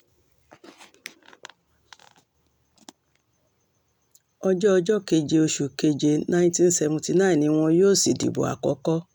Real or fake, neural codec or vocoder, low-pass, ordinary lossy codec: real; none; 19.8 kHz; none